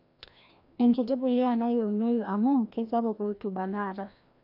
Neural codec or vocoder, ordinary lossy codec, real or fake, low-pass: codec, 16 kHz, 1 kbps, FreqCodec, larger model; AAC, 48 kbps; fake; 5.4 kHz